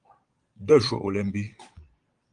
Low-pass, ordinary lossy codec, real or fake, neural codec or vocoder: 9.9 kHz; Opus, 24 kbps; fake; vocoder, 22.05 kHz, 80 mel bands, Vocos